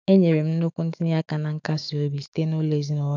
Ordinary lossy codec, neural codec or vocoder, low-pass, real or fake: none; autoencoder, 48 kHz, 128 numbers a frame, DAC-VAE, trained on Japanese speech; 7.2 kHz; fake